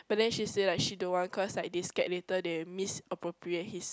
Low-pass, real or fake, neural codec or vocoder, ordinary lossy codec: none; real; none; none